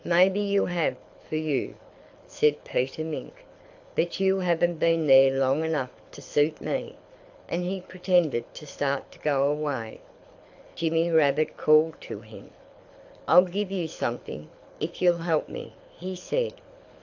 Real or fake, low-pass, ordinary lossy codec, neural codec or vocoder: fake; 7.2 kHz; AAC, 48 kbps; codec, 24 kHz, 6 kbps, HILCodec